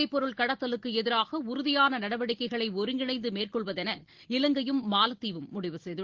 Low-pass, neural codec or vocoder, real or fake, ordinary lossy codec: 7.2 kHz; none; real; Opus, 16 kbps